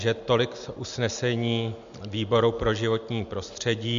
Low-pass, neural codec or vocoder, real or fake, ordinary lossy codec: 7.2 kHz; none; real; MP3, 64 kbps